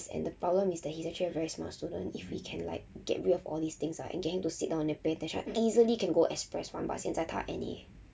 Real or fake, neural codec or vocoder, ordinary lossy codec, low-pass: real; none; none; none